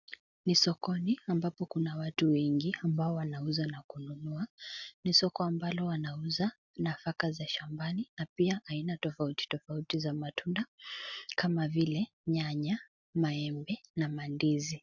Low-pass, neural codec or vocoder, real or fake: 7.2 kHz; none; real